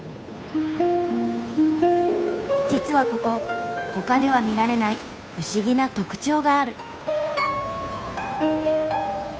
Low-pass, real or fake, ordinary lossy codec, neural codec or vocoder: none; fake; none; codec, 16 kHz, 2 kbps, FunCodec, trained on Chinese and English, 25 frames a second